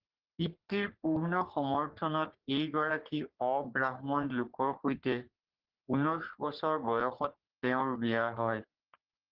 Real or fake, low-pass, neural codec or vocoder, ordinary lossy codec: fake; 5.4 kHz; codec, 44.1 kHz, 3.4 kbps, Pupu-Codec; Opus, 16 kbps